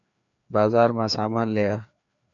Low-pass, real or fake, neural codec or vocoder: 7.2 kHz; fake; codec, 16 kHz, 2 kbps, FreqCodec, larger model